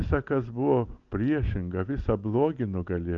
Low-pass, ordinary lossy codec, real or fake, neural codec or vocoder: 7.2 kHz; Opus, 24 kbps; real; none